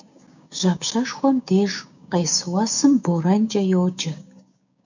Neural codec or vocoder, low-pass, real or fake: codec, 16 kHz, 6 kbps, DAC; 7.2 kHz; fake